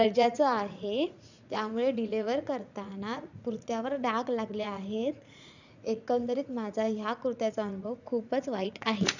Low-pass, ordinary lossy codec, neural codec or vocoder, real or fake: 7.2 kHz; none; vocoder, 44.1 kHz, 80 mel bands, Vocos; fake